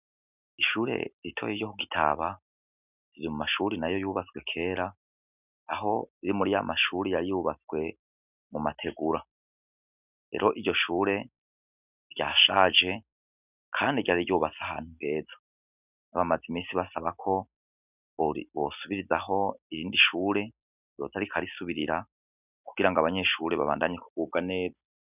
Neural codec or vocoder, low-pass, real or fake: none; 3.6 kHz; real